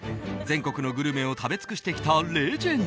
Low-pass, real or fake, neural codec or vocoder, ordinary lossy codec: none; real; none; none